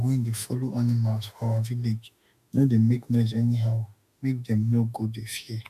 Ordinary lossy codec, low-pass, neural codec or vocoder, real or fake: none; 14.4 kHz; autoencoder, 48 kHz, 32 numbers a frame, DAC-VAE, trained on Japanese speech; fake